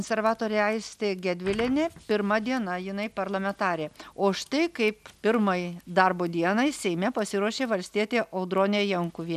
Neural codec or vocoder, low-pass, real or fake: none; 14.4 kHz; real